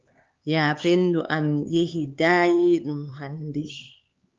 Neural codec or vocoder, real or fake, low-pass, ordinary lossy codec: codec, 16 kHz, 4 kbps, X-Codec, HuBERT features, trained on LibriSpeech; fake; 7.2 kHz; Opus, 32 kbps